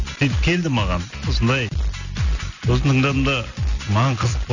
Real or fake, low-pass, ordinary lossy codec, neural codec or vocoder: real; 7.2 kHz; none; none